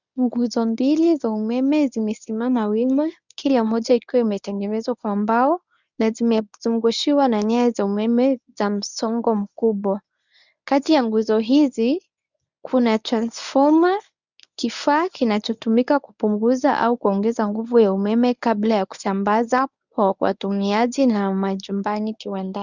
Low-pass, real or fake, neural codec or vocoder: 7.2 kHz; fake; codec, 24 kHz, 0.9 kbps, WavTokenizer, medium speech release version 1